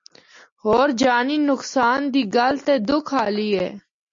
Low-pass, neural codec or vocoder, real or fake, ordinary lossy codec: 7.2 kHz; none; real; MP3, 48 kbps